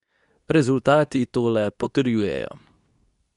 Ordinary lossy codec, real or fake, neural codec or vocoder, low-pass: none; fake; codec, 24 kHz, 0.9 kbps, WavTokenizer, medium speech release version 2; 10.8 kHz